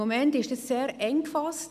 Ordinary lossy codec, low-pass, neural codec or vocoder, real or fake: none; 14.4 kHz; none; real